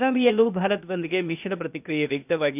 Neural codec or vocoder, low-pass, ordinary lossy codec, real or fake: codec, 16 kHz, 0.8 kbps, ZipCodec; 3.6 kHz; none; fake